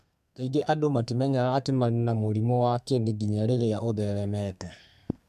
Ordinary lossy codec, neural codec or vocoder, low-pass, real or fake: MP3, 96 kbps; codec, 32 kHz, 1.9 kbps, SNAC; 14.4 kHz; fake